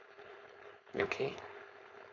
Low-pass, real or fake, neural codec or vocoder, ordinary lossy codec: 7.2 kHz; fake; codec, 16 kHz, 4.8 kbps, FACodec; none